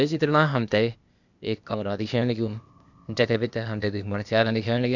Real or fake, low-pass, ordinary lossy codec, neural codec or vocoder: fake; 7.2 kHz; none; codec, 16 kHz, 0.8 kbps, ZipCodec